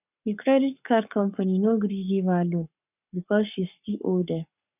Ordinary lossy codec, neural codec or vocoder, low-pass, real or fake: none; codec, 44.1 kHz, 7.8 kbps, Pupu-Codec; 3.6 kHz; fake